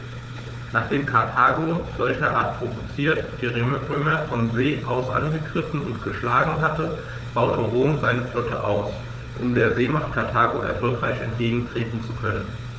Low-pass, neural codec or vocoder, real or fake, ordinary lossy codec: none; codec, 16 kHz, 4 kbps, FunCodec, trained on Chinese and English, 50 frames a second; fake; none